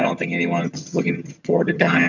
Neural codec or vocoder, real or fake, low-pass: vocoder, 22.05 kHz, 80 mel bands, HiFi-GAN; fake; 7.2 kHz